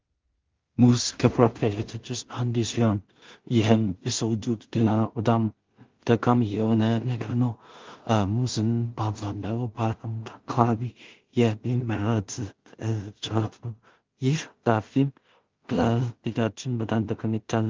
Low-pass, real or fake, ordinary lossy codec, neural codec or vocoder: 7.2 kHz; fake; Opus, 32 kbps; codec, 16 kHz in and 24 kHz out, 0.4 kbps, LongCat-Audio-Codec, two codebook decoder